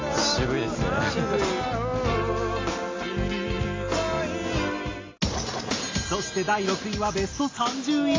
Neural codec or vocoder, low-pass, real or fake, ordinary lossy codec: none; 7.2 kHz; real; AAC, 32 kbps